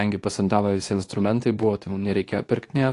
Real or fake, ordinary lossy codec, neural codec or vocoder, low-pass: fake; AAC, 48 kbps; codec, 24 kHz, 0.9 kbps, WavTokenizer, medium speech release version 2; 10.8 kHz